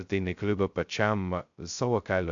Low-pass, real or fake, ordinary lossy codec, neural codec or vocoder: 7.2 kHz; fake; MP3, 64 kbps; codec, 16 kHz, 0.2 kbps, FocalCodec